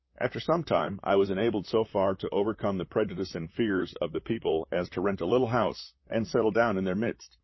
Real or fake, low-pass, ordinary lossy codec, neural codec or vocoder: fake; 7.2 kHz; MP3, 24 kbps; vocoder, 44.1 kHz, 128 mel bands, Pupu-Vocoder